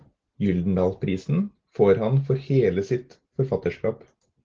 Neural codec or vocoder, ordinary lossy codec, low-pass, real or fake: none; Opus, 16 kbps; 7.2 kHz; real